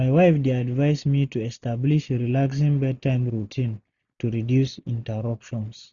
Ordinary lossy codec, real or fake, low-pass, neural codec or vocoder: none; real; 7.2 kHz; none